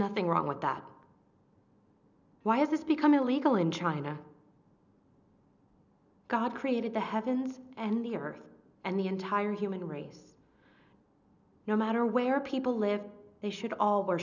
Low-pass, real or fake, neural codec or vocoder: 7.2 kHz; real; none